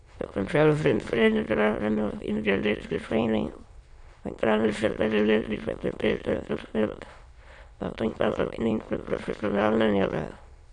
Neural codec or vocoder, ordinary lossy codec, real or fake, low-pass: autoencoder, 22.05 kHz, a latent of 192 numbers a frame, VITS, trained on many speakers; none; fake; 9.9 kHz